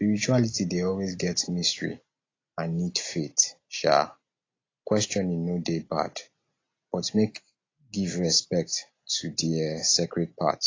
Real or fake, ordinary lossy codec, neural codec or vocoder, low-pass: real; AAC, 32 kbps; none; 7.2 kHz